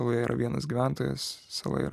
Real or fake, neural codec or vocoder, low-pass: real; none; 14.4 kHz